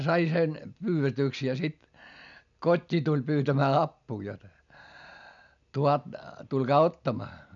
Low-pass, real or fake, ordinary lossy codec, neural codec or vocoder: 7.2 kHz; real; none; none